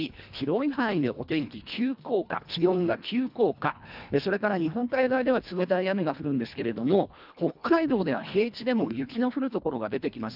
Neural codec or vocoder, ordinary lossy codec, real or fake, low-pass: codec, 24 kHz, 1.5 kbps, HILCodec; none; fake; 5.4 kHz